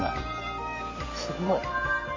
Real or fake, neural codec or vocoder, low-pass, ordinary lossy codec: fake; vocoder, 44.1 kHz, 128 mel bands every 256 samples, BigVGAN v2; 7.2 kHz; MP3, 32 kbps